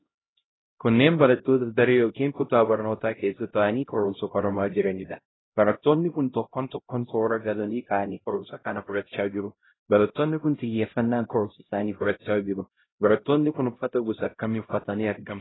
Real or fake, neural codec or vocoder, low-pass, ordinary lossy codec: fake; codec, 16 kHz, 0.5 kbps, X-Codec, HuBERT features, trained on LibriSpeech; 7.2 kHz; AAC, 16 kbps